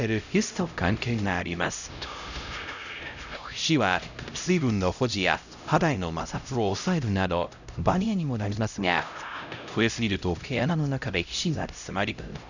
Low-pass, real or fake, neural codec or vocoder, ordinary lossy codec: 7.2 kHz; fake; codec, 16 kHz, 0.5 kbps, X-Codec, HuBERT features, trained on LibriSpeech; none